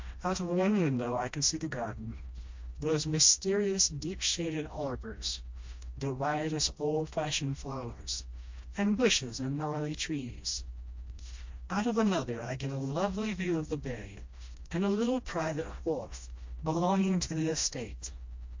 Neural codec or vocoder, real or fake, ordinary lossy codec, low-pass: codec, 16 kHz, 1 kbps, FreqCodec, smaller model; fake; MP3, 48 kbps; 7.2 kHz